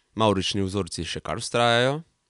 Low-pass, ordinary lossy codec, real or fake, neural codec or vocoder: 10.8 kHz; none; real; none